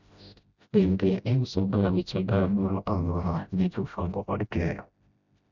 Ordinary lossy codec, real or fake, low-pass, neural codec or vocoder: none; fake; 7.2 kHz; codec, 16 kHz, 0.5 kbps, FreqCodec, smaller model